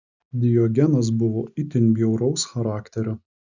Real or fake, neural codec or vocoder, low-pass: real; none; 7.2 kHz